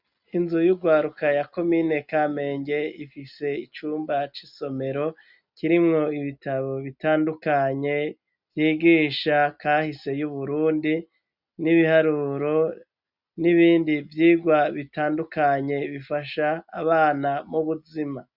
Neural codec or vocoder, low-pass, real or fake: none; 5.4 kHz; real